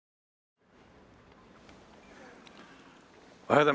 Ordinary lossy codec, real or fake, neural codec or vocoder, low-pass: none; real; none; none